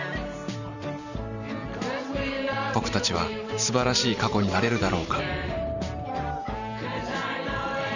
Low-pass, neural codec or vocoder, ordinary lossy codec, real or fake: 7.2 kHz; vocoder, 44.1 kHz, 128 mel bands every 512 samples, BigVGAN v2; none; fake